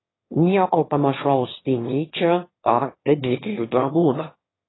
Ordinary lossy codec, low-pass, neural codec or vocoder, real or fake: AAC, 16 kbps; 7.2 kHz; autoencoder, 22.05 kHz, a latent of 192 numbers a frame, VITS, trained on one speaker; fake